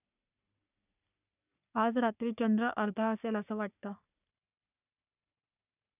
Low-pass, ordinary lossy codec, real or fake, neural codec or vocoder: 3.6 kHz; none; fake; codec, 44.1 kHz, 3.4 kbps, Pupu-Codec